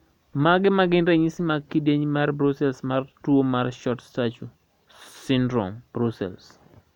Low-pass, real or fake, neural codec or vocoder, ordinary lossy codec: 19.8 kHz; real; none; none